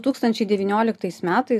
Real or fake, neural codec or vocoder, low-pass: real; none; 14.4 kHz